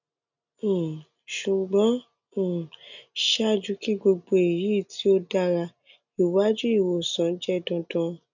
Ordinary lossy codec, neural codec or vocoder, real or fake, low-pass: none; none; real; 7.2 kHz